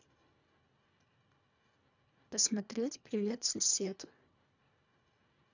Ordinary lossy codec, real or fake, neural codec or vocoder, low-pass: none; fake; codec, 24 kHz, 1.5 kbps, HILCodec; 7.2 kHz